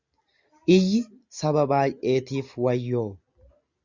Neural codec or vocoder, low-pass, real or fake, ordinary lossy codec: none; 7.2 kHz; real; Opus, 64 kbps